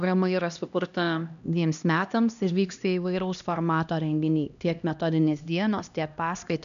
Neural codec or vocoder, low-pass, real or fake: codec, 16 kHz, 1 kbps, X-Codec, HuBERT features, trained on LibriSpeech; 7.2 kHz; fake